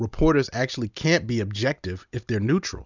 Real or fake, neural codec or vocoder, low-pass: real; none; 7.2 kHz